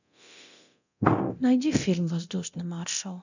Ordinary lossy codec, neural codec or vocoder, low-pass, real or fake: none; codec, 24 kHz, 0.9 kbps, DualCodec; 7.2 kHz; fake